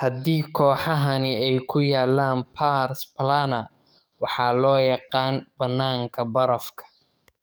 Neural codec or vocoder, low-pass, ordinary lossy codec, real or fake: codec, 44.1 kHz, 7.8 kbps, DAC; none; none; fake